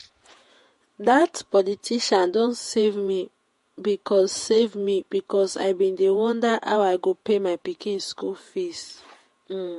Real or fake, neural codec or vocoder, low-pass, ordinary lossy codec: fake; vocoder, 48 kHz, 128 mel bands, Vocos; 14.4 kHz; MP3, 48 kbps